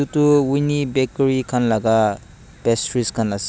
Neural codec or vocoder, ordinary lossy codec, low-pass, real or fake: none; none; none; real